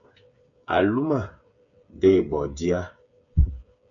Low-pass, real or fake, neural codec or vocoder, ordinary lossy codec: 7.2 kHz; fake; codec, 16 kHz, 8 kbps, FreqCodec, smaller model; MP3, 64 kbps